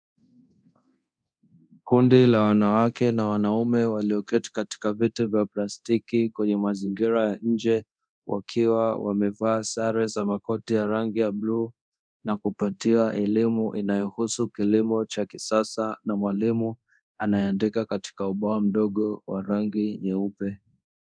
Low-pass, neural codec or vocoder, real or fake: 9.9 kHz; codec, 24 kHz, 0.9 kbps, DualCodec; fake